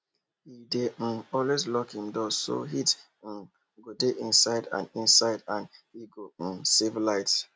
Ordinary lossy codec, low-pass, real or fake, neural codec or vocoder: none; none; real; none